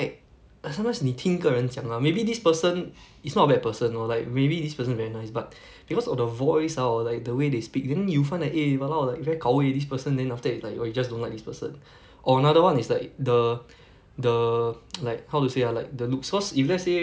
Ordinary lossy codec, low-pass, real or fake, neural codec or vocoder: none; none; real; none